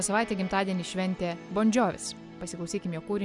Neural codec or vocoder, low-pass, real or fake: none; 10.8 kHz; real